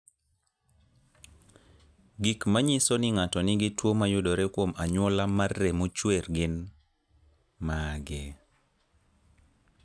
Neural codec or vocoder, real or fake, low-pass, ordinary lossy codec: none; real; none; none